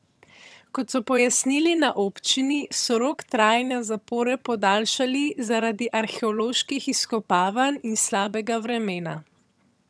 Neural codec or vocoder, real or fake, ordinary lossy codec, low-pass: vocoder, 22.05 kHz, 80 mel bands, HiFi-GAN; fake; none; none